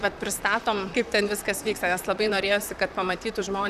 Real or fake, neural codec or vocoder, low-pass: fake; vocoder, 44.1 kHz, 128 mel bands, Pupu-Vocoder; 14.4 kHz